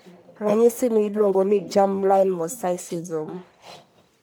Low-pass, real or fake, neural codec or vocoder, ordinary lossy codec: none; fake; codec, 44.1 kHz, 1.7 kbps, Pupu-Codec; none